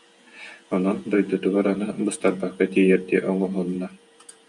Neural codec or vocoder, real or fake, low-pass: vocoder, 48 kHz, 128 mel bands, Vocos; fake; 10.8 kHz